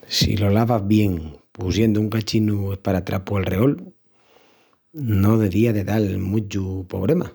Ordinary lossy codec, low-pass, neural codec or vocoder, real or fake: none; none; none; real